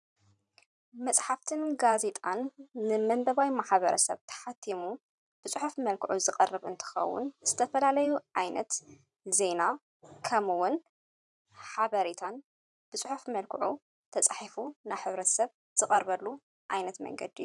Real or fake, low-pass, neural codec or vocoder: fake; 10.8 kHz; vocoder, 44.1 kHz, 128 mel bands every 512 samples, BigVGAN v2